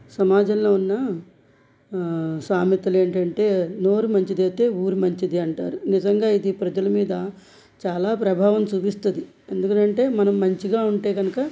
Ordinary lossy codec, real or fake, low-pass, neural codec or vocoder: none; real; none; none